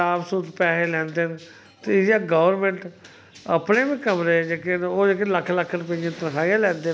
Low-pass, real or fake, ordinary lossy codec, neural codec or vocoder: none; real; none; none